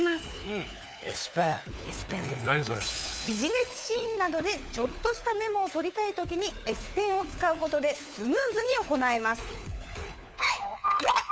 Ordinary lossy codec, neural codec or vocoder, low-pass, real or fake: none; codec, 16 kHz, 4 kbps, FunCodec, trained on LibriTTS, 50 frames a second; none; fake